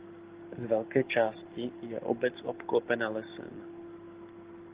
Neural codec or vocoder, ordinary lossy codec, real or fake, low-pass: none; Opus, 16 kbps; real; 3.6 kHz